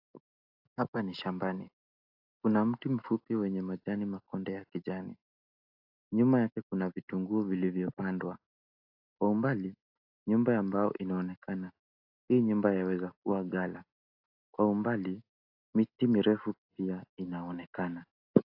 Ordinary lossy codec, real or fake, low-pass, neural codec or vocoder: AAC, 48 kbps; real; 5.4 kHz; none